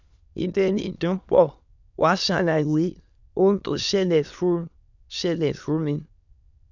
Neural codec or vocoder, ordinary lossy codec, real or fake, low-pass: autoencoder, 22.05 kHz, a latent of 192 numbers a frame, VITS, trained on many speakers; none; fake; 7.2 kHz